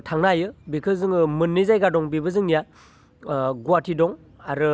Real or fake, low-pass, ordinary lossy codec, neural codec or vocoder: real; none; none; none